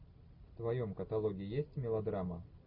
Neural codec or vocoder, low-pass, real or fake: none; 5.4 kHz; real